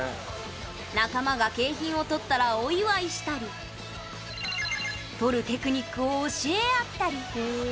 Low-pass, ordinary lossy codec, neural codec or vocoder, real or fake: none; none; none; real